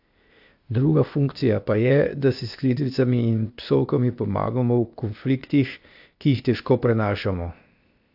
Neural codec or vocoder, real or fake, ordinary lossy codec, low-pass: codec, 16 kHz, 0.8 kbps, ZipCodec; fake; AAC, 48 kbps; 5.4 kHz